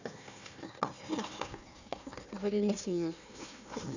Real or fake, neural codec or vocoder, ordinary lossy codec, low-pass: fake; codec, 16 kHz, 1 kbps, FunCodec, trained on Chinese and English, 50 frames a second; none; 7.2 kHz